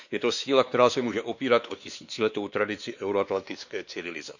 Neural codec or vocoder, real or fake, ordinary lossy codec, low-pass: codec, 16 kHz, 2 kbps, X-Codec, WavLM features, trained on Multilingual LibriSpeech; fake; none; 7.2 kHz